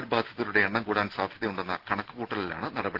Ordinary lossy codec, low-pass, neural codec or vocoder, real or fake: Opus, 16 kbps; 5.4 kHz; none; real